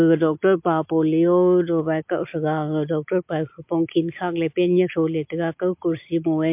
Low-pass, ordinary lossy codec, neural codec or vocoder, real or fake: 3.6 kHz; MP3, 32 kbps; none; real